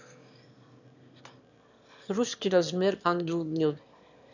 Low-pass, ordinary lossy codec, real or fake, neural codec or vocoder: 7.2 kHz; none; fake; autoencoder, 22.05 kHz, a latent of 192 numbers a frame, VITS, trained on one speaker